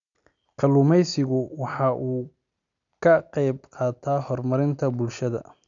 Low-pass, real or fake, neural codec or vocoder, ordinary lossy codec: 7.2 kHz; real; none; none